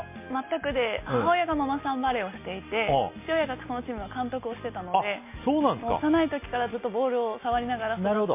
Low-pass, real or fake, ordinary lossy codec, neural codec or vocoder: 3.6 kHz; real; MP3, 24 kbps; none